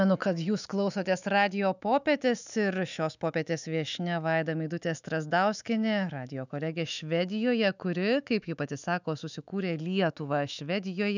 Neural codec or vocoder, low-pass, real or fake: autoencoder, 48 kHz, 128 numbers a frame, DAC-VAE, trained on Japanese speech; 7.2 kHz; fake